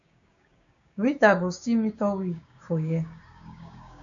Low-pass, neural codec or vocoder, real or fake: 7.2 kHz; codec, 16 kHz, 6 kbps, DAC; fake